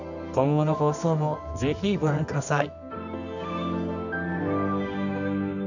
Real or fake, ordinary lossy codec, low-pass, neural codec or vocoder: fake; none; 7.2 kHz; codec, 24 kHz, 0.9 kbps, WavTokenizer, medium music audio release